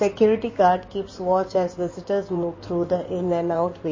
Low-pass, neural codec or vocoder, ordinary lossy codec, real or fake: 7.2 kHz; codec, 16 kHz in and 24 kHz out, 2.2 kbps, FireRedTTS-2 codec; MP3, 32 kbps; fake